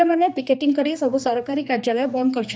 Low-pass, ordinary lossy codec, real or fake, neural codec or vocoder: none; none; fake; codec, 16 kHz, 2 kbps, X-Codec, HuBERT features, trained on general audio